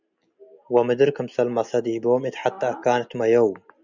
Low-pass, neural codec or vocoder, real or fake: 7.2 kHz; none; real